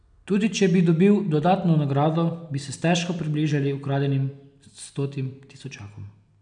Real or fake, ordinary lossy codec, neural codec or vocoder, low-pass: real; none; none; 9.9 kHz